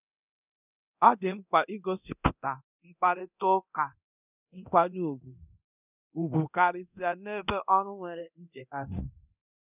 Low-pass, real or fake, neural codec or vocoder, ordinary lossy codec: 3.6 kHz; fake; codec, 24 kHz, 0.9 kbps, DualCodec; none